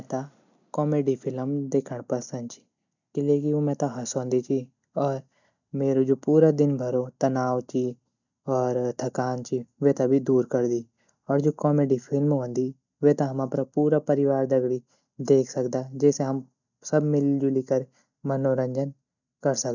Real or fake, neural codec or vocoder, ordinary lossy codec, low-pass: real; none; none; 7.2 kHz